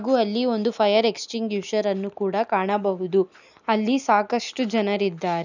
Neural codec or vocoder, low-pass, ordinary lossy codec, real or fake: none; 7.2 kHz; none; real